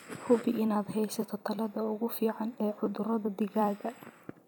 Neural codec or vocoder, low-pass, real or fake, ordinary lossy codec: vocoder, 44.1 kHz, 128 mel bands every 256 samples, BigVGAN v2; none; fake; none